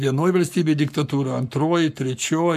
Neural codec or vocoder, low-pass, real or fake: codec, 44.1 kHz, 7.8 kbps, Pupu-Codec; 14.4 kHz; fake